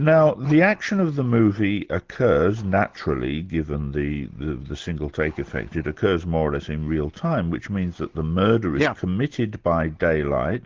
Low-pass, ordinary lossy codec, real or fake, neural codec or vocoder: 7.2 kHz; Opus, 16 kbps; real; none